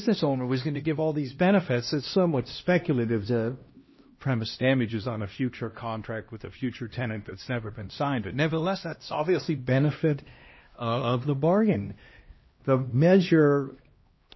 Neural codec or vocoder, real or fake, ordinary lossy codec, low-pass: codec, 16 kHz, 1 kbps, X-Codec, HuBERT features, trained on LibriSpeech; fake; MP3, 24 kbps; 7.2 kHz